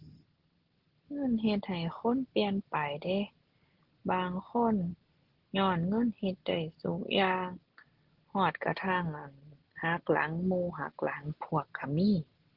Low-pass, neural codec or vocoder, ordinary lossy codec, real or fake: 5.4 kHz; none; Opus, 16 kbps; real